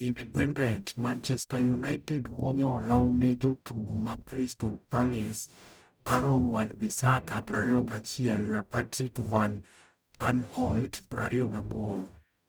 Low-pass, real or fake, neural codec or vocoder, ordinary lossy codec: none; fake; codec, 44.1 kHz, 0.9 kbps, DAC; none